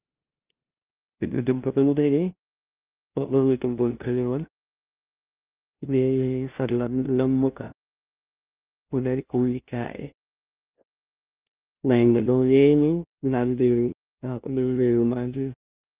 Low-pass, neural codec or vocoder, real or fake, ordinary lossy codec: 3.6 kHz; codec, 16 kHz, 0.5 kbps, FunCodec, trained on LibriTTS, 25 frames a second; fake; Opus, 24 kbps